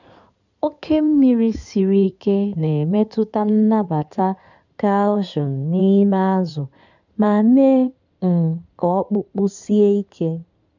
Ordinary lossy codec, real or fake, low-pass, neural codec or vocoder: none; fake; 7.2 kHz; codec, 16 kHz in and 24 kHz out, 2.2 kbps, FireRedTTS-2 codec